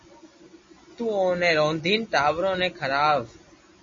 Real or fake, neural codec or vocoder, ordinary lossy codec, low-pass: real; none; MP3, 32 kbps; 7.2 kHz